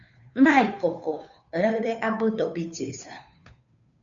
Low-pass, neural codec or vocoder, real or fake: 7.2 kHz; codec, 16 kHz, 2 kbps, FunCodec, trained on Chinese and English, 25 frames a second; fake